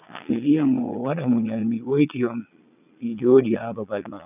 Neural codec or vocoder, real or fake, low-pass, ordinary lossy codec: vocoder, 22.05 kHz, 80 mel bands, Vocos; fake; 3.6 kHz; none